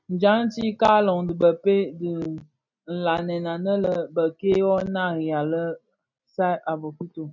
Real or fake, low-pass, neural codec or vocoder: real; 7.2 kHz; none